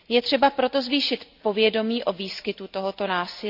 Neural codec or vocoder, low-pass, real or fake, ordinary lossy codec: none; 5.4 kHz; real; none